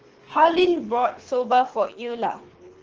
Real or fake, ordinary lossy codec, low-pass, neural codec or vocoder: fake; Opus, 16 kbps; 7.2 kHz; codec, 24 kHz, 3 kbps, HILCodec